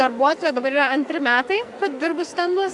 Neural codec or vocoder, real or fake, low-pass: codec, 44.1 kHz, 2.6 kbps, SNAC; fake; 10.8 kHz